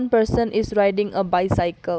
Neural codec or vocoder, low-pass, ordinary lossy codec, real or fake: none; none; none; real